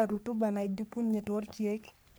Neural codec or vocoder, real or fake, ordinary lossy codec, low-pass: codec, 44.1 kHz, 3.4 kbps, Pupu-Codec; fake; none; none